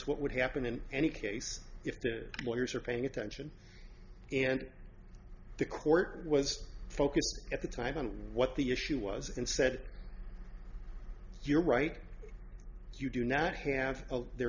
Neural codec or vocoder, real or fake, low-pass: none; real; 7.2 kHz